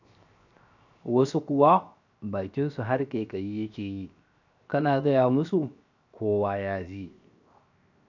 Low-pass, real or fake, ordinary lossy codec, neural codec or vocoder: 7.2 kHz; fake; none; codec, 16 kHz, 0.7 kbps, FocalCodec